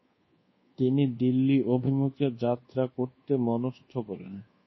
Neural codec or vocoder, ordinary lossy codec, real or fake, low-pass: codec, 24 kHz, 1.2 kbps, DualCodec; MP3, 24 kbps; fake; 7.2 kHz